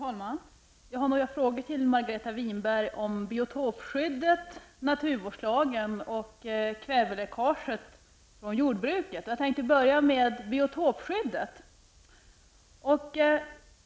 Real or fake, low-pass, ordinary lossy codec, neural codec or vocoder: real; none; none; none